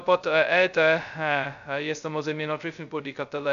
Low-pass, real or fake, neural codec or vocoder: 7.2 kHz; fake; codec, 16 kHz, 0.2 kbps, FocalCodec